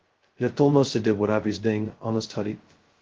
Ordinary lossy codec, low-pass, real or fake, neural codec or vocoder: Opus, 16 kbps; 7.2 kHz; fake; codec, 16 kHz, 0.2 kbps, FocalCodec